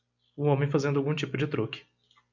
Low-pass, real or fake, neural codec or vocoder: 7.2 kHz; real; none